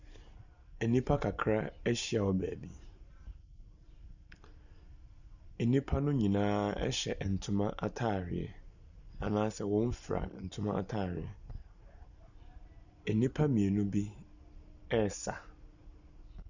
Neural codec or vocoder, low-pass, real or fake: none; 7.2 kHz; real